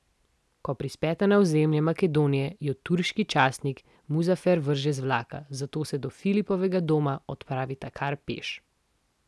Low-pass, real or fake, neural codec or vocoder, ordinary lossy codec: none; real; none; none